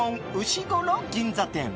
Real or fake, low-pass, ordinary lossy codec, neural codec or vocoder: real; none; none; none